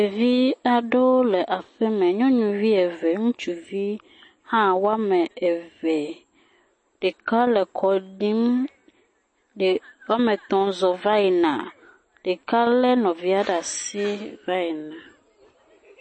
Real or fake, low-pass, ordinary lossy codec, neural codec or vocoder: real; 9.9 kHz; MP3, 32 kbps; none